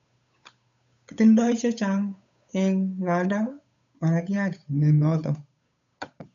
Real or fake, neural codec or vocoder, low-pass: fake; codec, 16 kHz, 8 kbps, FunCodec, trained on Chinese and English, 25 frames a second; 7.2 kHz